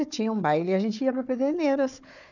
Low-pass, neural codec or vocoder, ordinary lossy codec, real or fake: 7.2 kHz; codec, 16 kHz, 4 kbps, FunCodec, trained on Chinese and English, 50 frames a second; none; fake